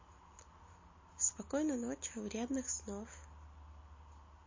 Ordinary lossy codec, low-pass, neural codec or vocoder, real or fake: MP3, 32 kbps; 7.2 kHz; none; real